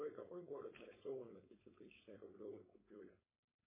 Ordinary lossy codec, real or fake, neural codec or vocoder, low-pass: MP3, 16 kbps; fake; codec, 16 kHz, 4.8 kbps, FACodec; 3.6 kHz